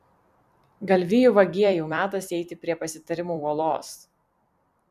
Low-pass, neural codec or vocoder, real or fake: 14.4 kHz; vocoder, 44.1 kHz, 128 mel bands every 256 samples, BigVGAN v2; fake